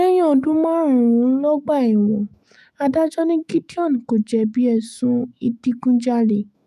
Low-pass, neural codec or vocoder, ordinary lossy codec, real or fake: 14.4 kHz; autoencoder, 48 kHz, 128 numbers a frame, DAC-VAE, trained on Japanese speech; none; fake